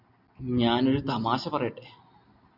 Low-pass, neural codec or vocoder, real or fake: 5.4 kHz; none; real